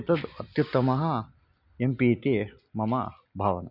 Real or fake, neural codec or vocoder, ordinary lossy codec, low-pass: real; none; none; 5.4 kHz